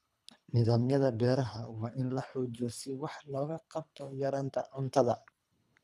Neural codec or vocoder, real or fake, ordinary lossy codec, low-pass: codec, 24 kHz, 3 kbps, HILCodec; fake; none; none